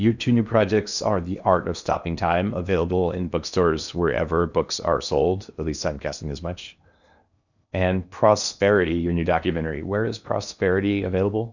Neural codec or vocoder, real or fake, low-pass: codec, 16 kHz in and 24 kHz out, 0.8 kbps, FocalCodec, streaming, 65536 codes; fake; 7.2 kHz